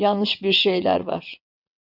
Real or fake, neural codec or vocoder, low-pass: fake; vocoder, 22.05 kHz, 80 mel bands, WaveNeXt; 5.4 kHz